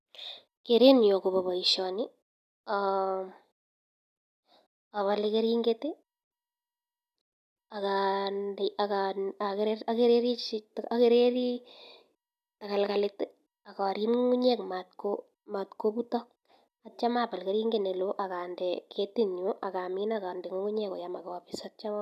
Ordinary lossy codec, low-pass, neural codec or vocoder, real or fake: none; 14.4 kHz; none; real